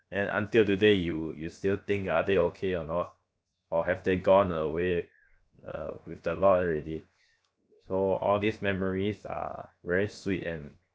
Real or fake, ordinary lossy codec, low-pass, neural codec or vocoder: fake; none; none; codec, 16 kHz, 0.7 kbps, FocalCodec